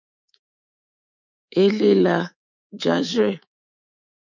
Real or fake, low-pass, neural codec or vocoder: fake; 7.2 kHz; codec, 24 kHz, 3.1 kbps, DualCodec